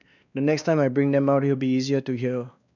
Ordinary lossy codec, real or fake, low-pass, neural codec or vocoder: none; fake; 7.2 kHz; codec, 16 kHz, 2 kbps, X-Codec, WavLM features, trained on Multilingual LibriSpeech